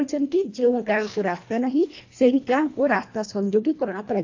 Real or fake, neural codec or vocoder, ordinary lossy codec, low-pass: fake; codec, 24 kHz, 1.5 kbps, HILCodec; AAC, 48 kbps; 7.2 kHz